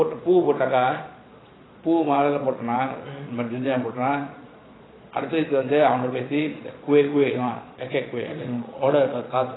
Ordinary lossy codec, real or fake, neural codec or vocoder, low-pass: AAC, 16 kbps; fake; vocoder, 22.05 kHz, 80 mel bands, Vocos; 7.2 kHz